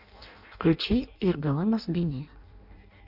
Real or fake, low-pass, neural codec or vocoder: fake; 5.4 kHz; codec, 16 kHz in and 24 kHz out, 0.6 kbps, FireRedTTS-2 codec